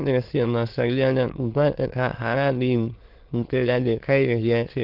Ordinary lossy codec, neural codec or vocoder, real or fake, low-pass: Opus, 16 kbps; autoencoder, 22.05 kHz, a latent of 192 numbers a frame, VITS, trained on many speakers; fake; 5.4 kHz